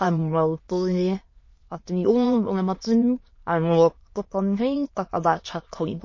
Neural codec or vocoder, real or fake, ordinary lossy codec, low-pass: autoencoder, 22.05 kHz, a latent of 192 numbers a frame, VITS, trained on many speakers; fake; MP3, 32 kbps; 7.2 kHz